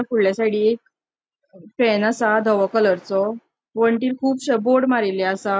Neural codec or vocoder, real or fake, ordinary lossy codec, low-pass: none; real; none; none